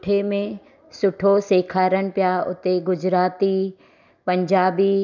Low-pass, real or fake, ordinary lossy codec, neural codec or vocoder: 7.2 kHz; real; none; none